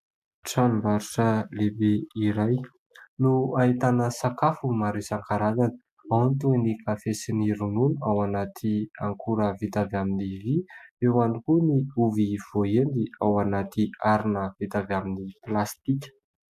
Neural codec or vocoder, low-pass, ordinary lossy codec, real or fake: none; 14.4 kHz; MP3, 96 kbps; real